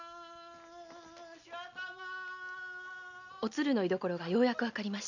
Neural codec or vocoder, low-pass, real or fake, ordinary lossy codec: none; 7.2 kHz; real; none